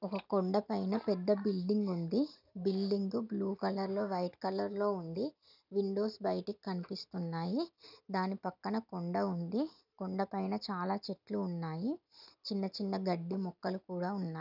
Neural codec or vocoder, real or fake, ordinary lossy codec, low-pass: none; real; none; 5.4 kHz